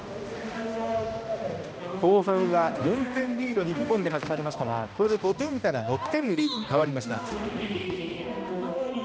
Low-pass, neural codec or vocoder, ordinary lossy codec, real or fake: none; codec, 16 kHz, 1 kbps, X-Codec, HuBERT features, trained on balanced general audio; none; fake